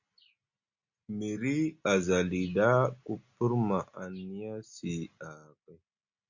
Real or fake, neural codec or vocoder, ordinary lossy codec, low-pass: real; none; Opus, 64 kbps; 7.2 kHz